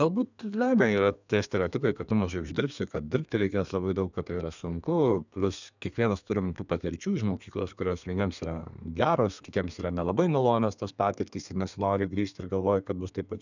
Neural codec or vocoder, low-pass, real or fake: codec, 32 kHz, 1.9 kbps, SNAC; 7.2 kHz; fake